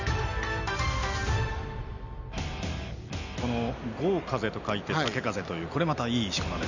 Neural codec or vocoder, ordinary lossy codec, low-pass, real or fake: none; none; 7.2 kHz; real